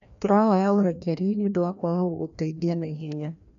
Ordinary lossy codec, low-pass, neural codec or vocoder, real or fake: none; 7.2 kHz; codec, 16 kHz, 1 kbps, FreqCodec, larger model; fake